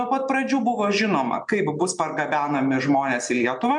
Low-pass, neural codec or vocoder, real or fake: 10.8 kHz; none; real